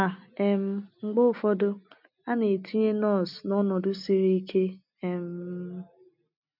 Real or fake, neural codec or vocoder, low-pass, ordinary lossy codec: real; none; 5.4 kHz; none